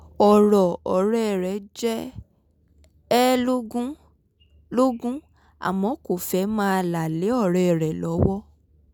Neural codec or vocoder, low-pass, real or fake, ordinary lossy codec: none; none; real; none